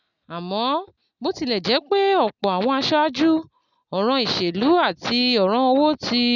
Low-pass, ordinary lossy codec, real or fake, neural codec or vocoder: 7.2 kHz; none; real; none